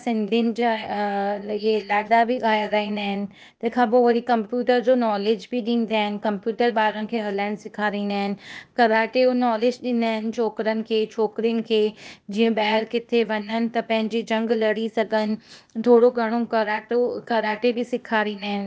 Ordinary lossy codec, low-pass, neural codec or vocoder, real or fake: none; none; codec, 16 kHz, 0.8 kbps, ZipCodec; fake